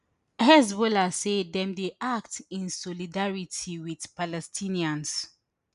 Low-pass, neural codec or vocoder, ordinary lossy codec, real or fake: 10.8 kHz; none; none; real